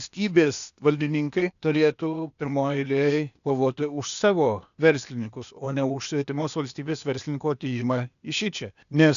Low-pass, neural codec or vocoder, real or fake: 7.2 kHz; codec, 16 kHz, 0.8 kbps, ZipCodec; fake